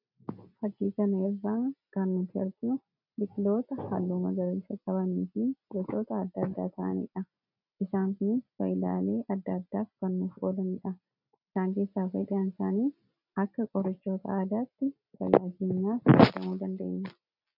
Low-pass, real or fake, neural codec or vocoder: 5.4 kHz; real; none